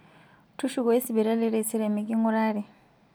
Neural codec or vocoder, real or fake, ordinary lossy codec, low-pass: none; real; none; none